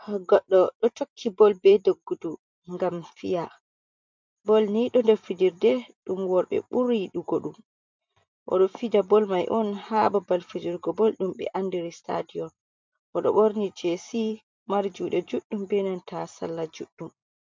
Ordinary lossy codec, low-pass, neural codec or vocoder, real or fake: MP3, 64 kbps; 7.2 kHz; vocoder, 44.1 kHz, 128 mel bands every 256 samples, BigVGAN v2; fake